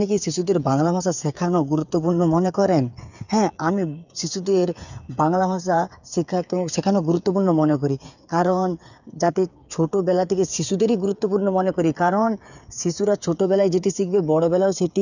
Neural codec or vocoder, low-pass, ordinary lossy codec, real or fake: codec, 16 kHz, 8 kbps, FreqCodec, smaller model; 7.2 kHz; none; fake